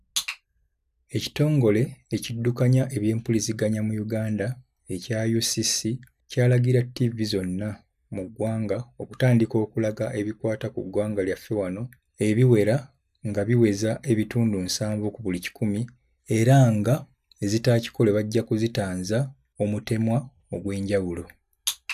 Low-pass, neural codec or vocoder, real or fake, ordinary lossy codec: 14.4 kHz; none; real; none